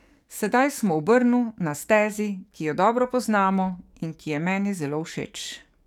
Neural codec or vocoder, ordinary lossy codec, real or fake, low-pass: codec, 44.1 kHz, 7.8 kbps, DAC; none; fake; 19.8 kHz